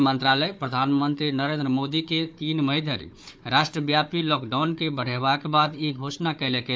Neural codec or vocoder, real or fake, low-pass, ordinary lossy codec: codec, 16 kHz, 4 kbps, FunCodec, trained on Chinese and English, 50 frames a second; fake; none; none